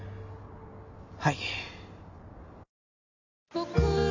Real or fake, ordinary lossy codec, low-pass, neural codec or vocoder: real; none; 7.2 kHz; none